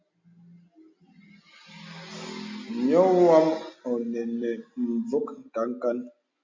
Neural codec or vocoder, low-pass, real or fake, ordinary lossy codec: none; 7.2 kHz; real; MP3, 64 kbps